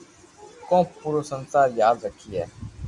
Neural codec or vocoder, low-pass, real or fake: none; 10.8 kHz; real